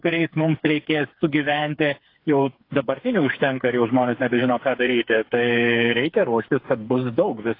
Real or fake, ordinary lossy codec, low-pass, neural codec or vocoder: fake; AAC, 32 kbps; 5.4 kHz; codec, 16 kHz, 4 kbps, FreqCodec, smaller model